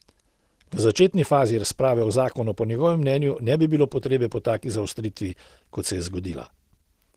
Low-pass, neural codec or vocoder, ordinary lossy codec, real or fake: 14.4 kHz; none; Opus, 16 kbps; real